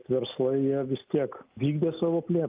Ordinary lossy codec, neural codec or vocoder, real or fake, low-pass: Opus, 24 kbps; none; real; 3.6 kHz